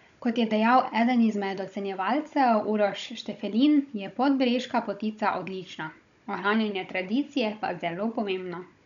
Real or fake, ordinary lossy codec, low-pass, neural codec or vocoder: fake; none; 7.2 kHz; codec, 16 kHz, 16 kbps, FunCodec, trained on Chinese and English, 50 frames a second